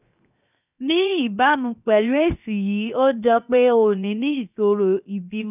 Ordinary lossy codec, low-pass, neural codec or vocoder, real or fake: none; 3.6 kHz; codec, 16 kHz, 0.7 kbps, FocalCodec; fake